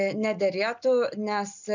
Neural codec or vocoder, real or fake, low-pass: none; real; 7.2 kHz